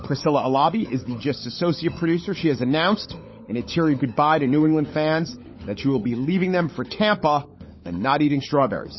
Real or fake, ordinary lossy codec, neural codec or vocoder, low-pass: fake; MP3, 24 kbps; codec, 16 kHz, 16 kbps, FunCodec, trained on LibriTTS, 50 frames a second; 7.2 kHz